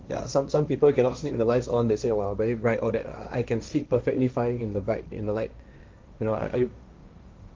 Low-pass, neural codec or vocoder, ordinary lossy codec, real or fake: 7.2 kHz; codec, 16 kHz, 1.1 kbps, Voila-Tokenizer; Opus, 24 kbps; fake